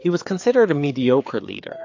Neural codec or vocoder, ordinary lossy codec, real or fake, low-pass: codec, 16 kHz, 4 kbps, FreqCodec, larger model; AAC, 48 kbps; fake; 7.2 kHz